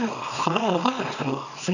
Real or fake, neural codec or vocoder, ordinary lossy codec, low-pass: fake; codec, 24 kHz, 0.9 kbps, WavTokenizer, small release; none; 7.2 kHz